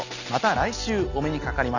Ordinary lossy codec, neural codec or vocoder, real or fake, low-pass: none; none; real; 7.2 kHz